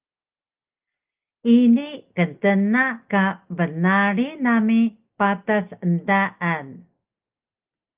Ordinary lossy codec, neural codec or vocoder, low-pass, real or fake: Opus, 32 kbps; none; 3.6 kHz; real